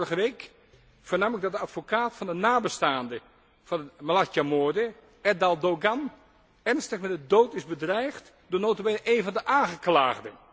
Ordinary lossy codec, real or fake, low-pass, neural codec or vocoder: none; real; none; none